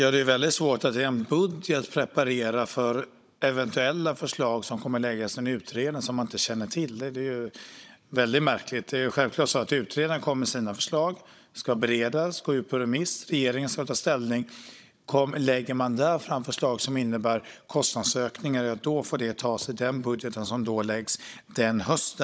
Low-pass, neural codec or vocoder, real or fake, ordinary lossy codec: none; codec, 16 kHz, 16 kbps, FunCodec, trained on Chinese and English, 50 frames a second; fake; none